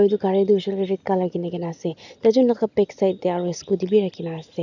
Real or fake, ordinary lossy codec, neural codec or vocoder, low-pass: real; none; none; 7.2 kHz